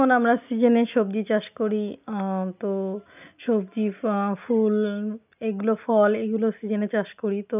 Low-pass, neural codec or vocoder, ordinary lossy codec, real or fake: 3.6 kHz; none; none; real